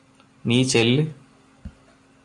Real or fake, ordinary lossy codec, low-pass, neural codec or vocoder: real; MP3, 64 kbps; 10.8 kHz; none